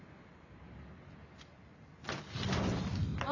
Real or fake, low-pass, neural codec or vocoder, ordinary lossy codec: real; 7.2 kHz; none; none